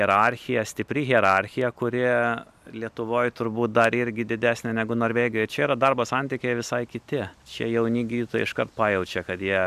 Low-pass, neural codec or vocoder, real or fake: 14.4 kHz; none; real